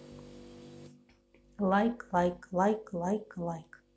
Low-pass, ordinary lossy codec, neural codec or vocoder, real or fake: none; none; none; real